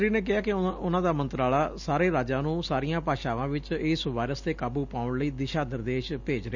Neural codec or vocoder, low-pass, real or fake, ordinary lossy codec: none; 7.2 kHz; real; none